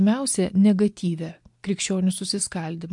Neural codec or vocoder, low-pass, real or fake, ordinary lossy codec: none; 10.8 kHz; real; MP3, 64 kbps